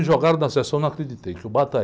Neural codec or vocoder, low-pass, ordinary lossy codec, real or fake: none; none; none; real